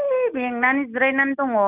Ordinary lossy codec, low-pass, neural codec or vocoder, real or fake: none; 3.6 kHz; none; real